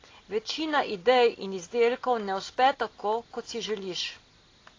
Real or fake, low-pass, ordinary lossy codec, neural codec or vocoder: real; 7.2 kHz; AAC, 32 kbps; none